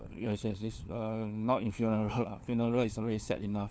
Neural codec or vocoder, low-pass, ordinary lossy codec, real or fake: codec, 16 kHz, 4 kbps, FunCodec, trained on LibriTTS, 50 frames a second; none; none; fake